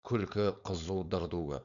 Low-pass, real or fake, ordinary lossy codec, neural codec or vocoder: 7.2 kHz; fake; none; codec, 16 kHz, 4.8 kbps, FACodec